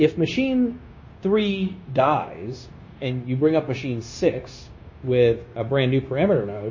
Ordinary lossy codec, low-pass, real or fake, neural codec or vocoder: MP3, 32 kbps; 7.2 kHz; fake; codec, 16 kHz, 0.9 kbps, LongCat-Audio-Codec